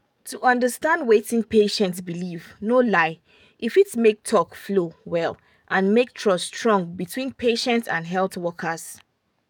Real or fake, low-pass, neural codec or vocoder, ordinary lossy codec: fake; 19.8 kHz; codec, 44.1 kHz, 7.8 kbps, DAC; none